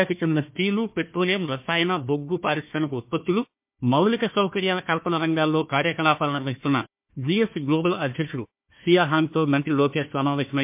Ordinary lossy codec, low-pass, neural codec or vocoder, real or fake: MP3, 32 kbps; 3.6 kHz; codec, 16 kHz, 1 kbps, FunCodec, trained on Chinese and English, 50 frames a second; fake